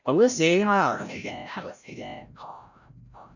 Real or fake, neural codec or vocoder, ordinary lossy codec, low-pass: fake; codec, 16 kHz, 0.5 kbps, FreqCodec, larger model; none; 7.2 kHz